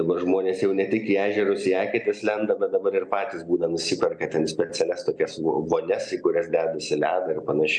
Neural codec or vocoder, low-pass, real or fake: none; 9.9 kHz; real